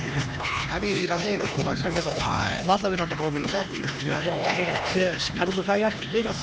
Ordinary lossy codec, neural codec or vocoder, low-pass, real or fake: none; codec, 16 kHz, 2 kbps, X-Codec, HuBERT features, trained on LibriSpeech; none; fake